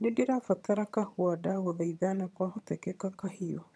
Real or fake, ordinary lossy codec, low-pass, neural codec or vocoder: fake; none; none; vocoder, 22.05 kHz, 80 mel bands, HiFi-GAN